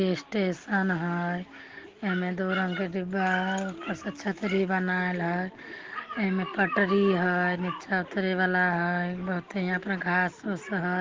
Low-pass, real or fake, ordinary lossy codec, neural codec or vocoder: 7.2 kHz; real; Opus, 16 kbps; none